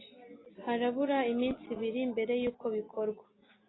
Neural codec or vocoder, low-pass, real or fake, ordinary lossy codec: none; 7.2 kHz; real; AAC, 16 kbps